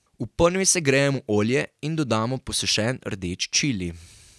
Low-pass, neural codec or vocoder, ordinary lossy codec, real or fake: none; none; none; real